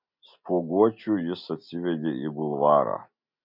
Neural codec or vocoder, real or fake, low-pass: none; real; 5.4 kHz